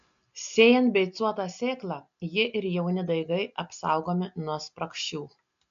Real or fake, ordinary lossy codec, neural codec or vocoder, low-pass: real; AAC, 64 kbps; none; 7.2 kHz